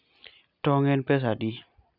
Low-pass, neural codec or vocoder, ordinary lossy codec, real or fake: 5.4 kHz; none; none; real